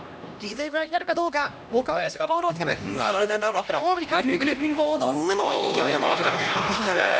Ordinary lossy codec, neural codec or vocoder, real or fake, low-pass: none; codec, 16 kHz, 1 kbps, X-Codec, HuBERT features, trained on LibriSpeech; fake; none